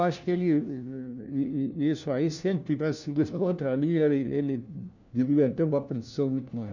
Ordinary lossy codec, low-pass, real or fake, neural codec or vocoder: none; 7.2 kHz; fake; codec, 16 kHz, 1 kbps, FunCodec, trained on LibriTTS, 50 frames a second